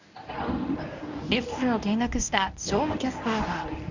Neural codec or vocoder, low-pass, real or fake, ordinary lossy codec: codec, 24 kHz, 0.9 kbps, WavTokenizer, medium speech release version 1; 7.2 kHz; fake; none